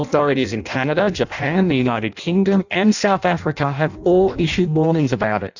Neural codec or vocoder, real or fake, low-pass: codec, 16 kHz in and 24 kHz out, 0.6 kbps, FireRedTTS-2 codec; fake; 7.2 kHz